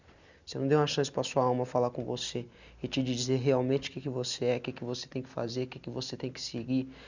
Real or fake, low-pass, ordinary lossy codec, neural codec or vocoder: real; 7.2 kHz; none; none